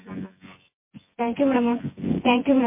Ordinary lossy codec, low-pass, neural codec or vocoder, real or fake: MP3, 24 kbps; 3.6 kHz; vocoder, 24 kHz, 100 mel bands, Vocos; fake